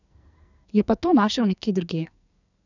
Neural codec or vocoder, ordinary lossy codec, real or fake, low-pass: codec, 44.1 kHz, 2.6 kbps, SNAC; none; fake; 7.2 kHz